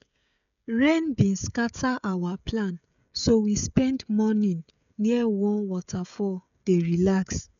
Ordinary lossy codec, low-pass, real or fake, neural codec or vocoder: none; 7.2 kHz; fake; codec, 16 kHz, 16 kbps, FreqCodec, smaller model